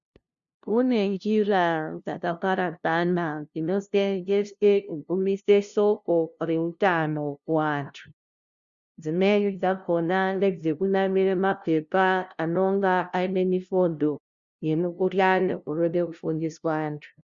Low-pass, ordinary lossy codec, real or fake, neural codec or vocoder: 7.2 kHz; Opus, 64 kbps; fake; codec, 16 kHz, 0.5 kbps, FunCodec, trained on LibriTTS, 25 frames a second